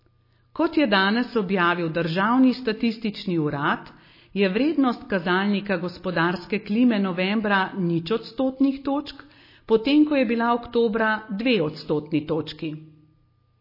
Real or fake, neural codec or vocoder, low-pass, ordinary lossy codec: real; none; 5.4 kHz; MP3, 24 kbps